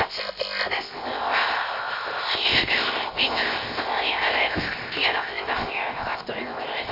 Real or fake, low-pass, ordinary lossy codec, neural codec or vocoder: fake; 5.4 kHz; MP3, 32 kbps; codec, 16 kHz, 0.7 kbps, FocalCodec